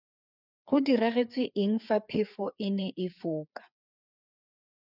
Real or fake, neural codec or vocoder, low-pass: fake; codec, 16 kHz, 4 kbps, FreqCodec, larger model; 5.4 kHz